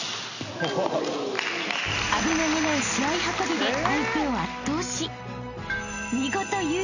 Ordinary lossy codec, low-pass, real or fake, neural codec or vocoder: none; 7.2 kHz; real; none